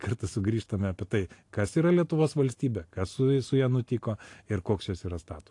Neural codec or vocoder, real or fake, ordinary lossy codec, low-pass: none; real; AAC, 48 kbps; 10.8 kHz